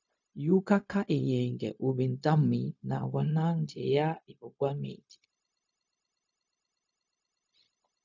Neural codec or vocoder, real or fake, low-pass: codec, 16 kHz, 0.4 kbps, LongCat-Audio-Codec; fake; 7.2 kHz